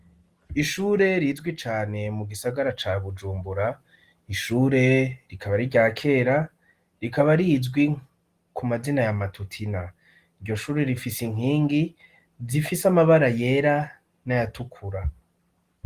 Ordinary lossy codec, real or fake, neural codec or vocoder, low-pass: Opus, 24 kbps; real; none; 14.4 kHz